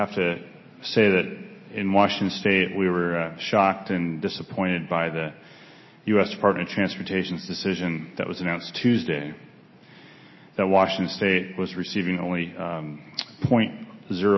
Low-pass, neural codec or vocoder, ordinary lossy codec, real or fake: 7.2 kHz; none; MP3, 24 kbps; real